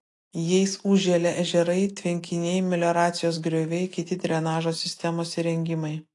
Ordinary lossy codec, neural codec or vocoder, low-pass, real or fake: AAC, 48 kbps; none; 10.8 kHz; real